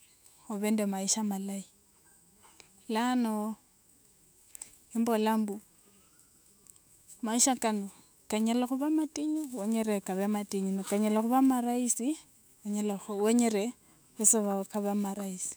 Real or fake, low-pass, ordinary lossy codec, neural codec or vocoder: fake; none; none; autoencoder, 48 kHz, 128 numbers a frame, DAC-VAE, trained on Japanese speech